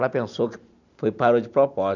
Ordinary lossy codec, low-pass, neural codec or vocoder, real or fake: none; 7.2 kHz; none; real